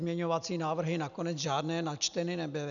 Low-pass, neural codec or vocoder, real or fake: 7.2 kHz; none; real